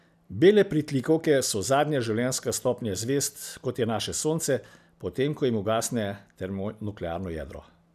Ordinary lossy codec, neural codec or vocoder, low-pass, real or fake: none; none; 14.4 kHz; real